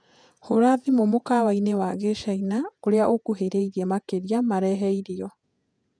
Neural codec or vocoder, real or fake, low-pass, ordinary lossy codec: vocoder, 48 kHz, 128 mel bands, Vocos; fake; 9.9 kHz; none